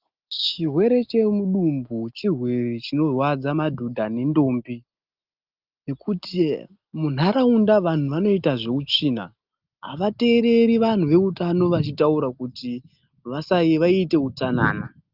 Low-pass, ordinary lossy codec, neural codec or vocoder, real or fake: 5.4 kHz; Opus, 24 kbps; none; real